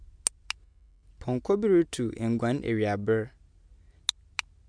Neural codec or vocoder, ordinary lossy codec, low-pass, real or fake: none; MP3, 96 kbps; 9.9 kHz; real